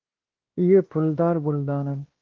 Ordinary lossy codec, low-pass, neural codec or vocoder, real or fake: Opus, 16 kbps; 7.2 kHz; codec, 16 kHz, 1 kbps, X-Codec, WavLM features, trained on Multilingual LibriSpeech; fake